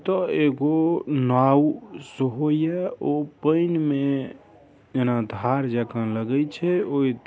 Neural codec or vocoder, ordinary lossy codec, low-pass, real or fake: none; none; none; real